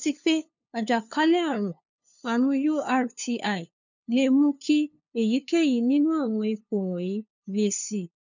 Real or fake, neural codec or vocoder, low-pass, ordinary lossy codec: fake; codec, 16 kHz, 2 kbps, FunCodec, trained on LibriTTS, 25 frames a second; 7.2 kHz; none